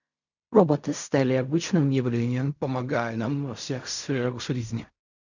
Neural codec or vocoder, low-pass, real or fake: codec, 16 kHz in and 24 kHz out, 0.4 kbps, LongCat-Audio-Codec, fine tuned four codebook decoder; 7.2 kHz; fake